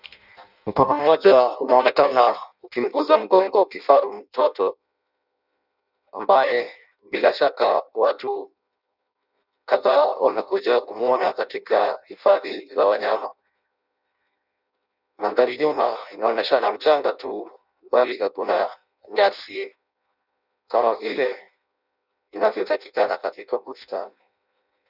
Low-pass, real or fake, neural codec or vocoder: 5.4 kHz; fake; codec, 16 kHz in and 24 kHz out, 0.6 kbps, FireRedTTS-2 codec